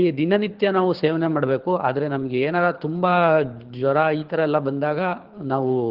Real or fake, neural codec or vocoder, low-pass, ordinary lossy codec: fake; codec, 24 kHz, 6 kbps, HILCodec; 5.4 kHz; Opus, 16 kbps